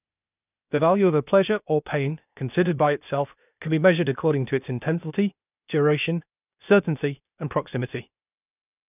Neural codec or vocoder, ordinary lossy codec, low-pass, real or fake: codec, 16 kHz, 0.8 kbps, ZipCodec; none; 3.6 kHz; fake